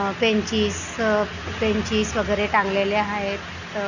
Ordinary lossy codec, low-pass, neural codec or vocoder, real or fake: none; 7.2 kHz; none; real